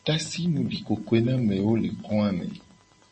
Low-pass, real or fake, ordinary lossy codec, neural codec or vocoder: 10.8 kHz; real; MP3, 32 kbps; none